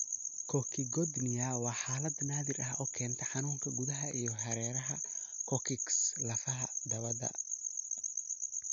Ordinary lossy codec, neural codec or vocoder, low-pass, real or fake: none; none; 7.2 kHz; real